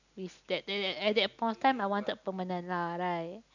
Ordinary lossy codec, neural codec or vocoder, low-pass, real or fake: none; none; 7.2 kHz; real